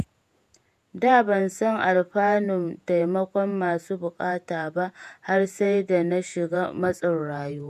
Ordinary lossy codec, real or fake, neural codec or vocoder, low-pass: none; fake; vocoder, 48 kHz, 128 mel bands, Vocos; 14.4 kHz